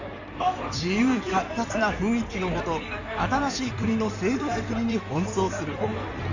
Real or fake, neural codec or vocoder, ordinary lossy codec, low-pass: fake; codec, 16 kHz in and 24 kHz out, 2.2 kbps, FireRedTTS-2 codec; none; 7.2 kHz